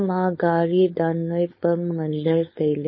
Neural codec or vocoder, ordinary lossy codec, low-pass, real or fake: codec, 16 kHz, 4.8 kbps, FACodec; MP3, 24 kbps; 7.2 kHz; fake